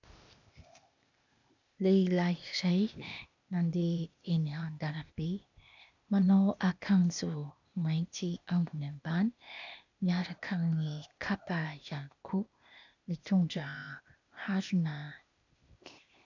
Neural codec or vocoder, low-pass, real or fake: codec, 16 kHz, 0.8 kbps, ZipCodec; 7.2 kHz; fake